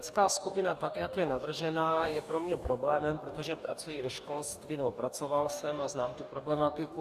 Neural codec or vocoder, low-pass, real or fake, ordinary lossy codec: codec, 44.1 kHz, 2.6 kbps, DAC; 14.4 kHz; fake; Opus, 64 kbps